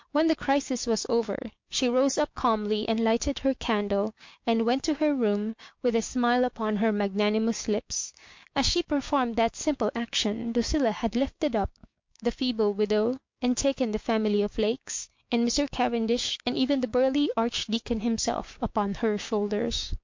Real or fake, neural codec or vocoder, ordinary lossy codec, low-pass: fake; codec, 16 kHz, 6 kbps, DAC; MP3, 48 kbps; 7.2 kHz